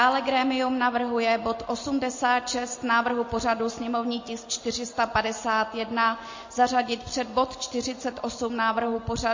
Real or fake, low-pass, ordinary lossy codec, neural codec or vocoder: real; 7.2 kHz; MP3, 32 kbps; none